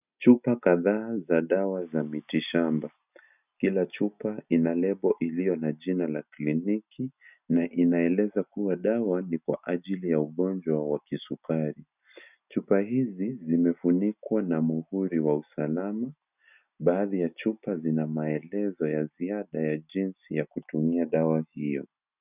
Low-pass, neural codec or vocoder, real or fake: 3.6 kHz; none; real